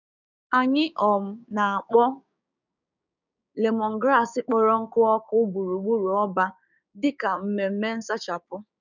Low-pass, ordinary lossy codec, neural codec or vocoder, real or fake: 7.2 kHz; none; codec, 16 kHz, 6 kbps, DAC; fake